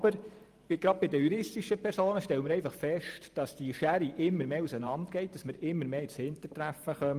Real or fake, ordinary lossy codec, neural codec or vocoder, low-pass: fake; Opus, 32 kbps; vocoder, 44.1 kHz, 128 mel bands every 512 samples, BigVGAN v2; 14.4 kHz